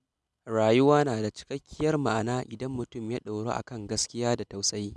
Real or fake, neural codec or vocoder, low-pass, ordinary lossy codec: real; none; none; none